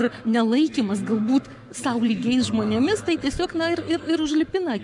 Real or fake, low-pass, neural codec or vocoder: fake; 10.8 kHz; codec, 44.1 kHz, 7.8 kbps, Pupu-Codec